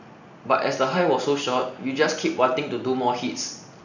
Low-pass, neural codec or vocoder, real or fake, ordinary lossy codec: 7.2 kHz; none; real; none